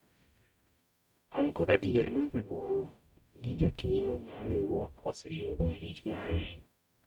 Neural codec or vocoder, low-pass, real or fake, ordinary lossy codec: codec, 44.1 kHz, 0.9 kbps, DAC; 19.8 kHz; fake; none